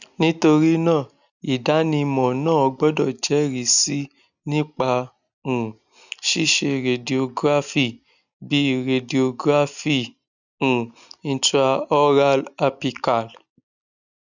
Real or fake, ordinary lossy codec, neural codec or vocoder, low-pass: real; none; none; 7.2 kHz